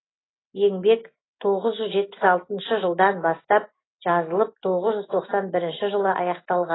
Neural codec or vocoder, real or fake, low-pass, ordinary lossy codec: none; real; 7.2 kHz; AAC, 16 kbps